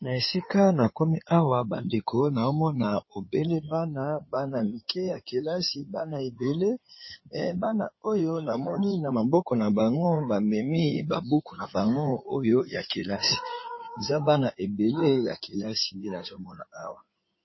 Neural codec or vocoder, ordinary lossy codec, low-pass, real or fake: codec, 16 kHz in and 24 kHz out, 2.2 kbps, FireRedTTS-2 codec; MP3, 24 kbps; 7.2 kHz; fake